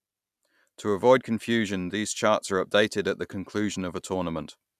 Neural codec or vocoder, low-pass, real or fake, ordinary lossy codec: none; 14.4 kHz; real; none